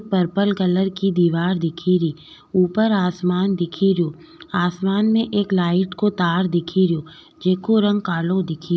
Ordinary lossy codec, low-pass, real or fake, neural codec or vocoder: none; none; real; none